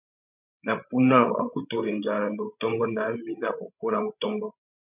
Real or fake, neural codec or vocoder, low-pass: fake; codec, 16 kHz, 16 kbps, FreqCodec, larger model; 3.6 kHz